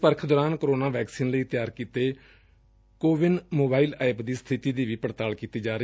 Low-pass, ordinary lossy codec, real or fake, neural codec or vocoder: none; none; real; none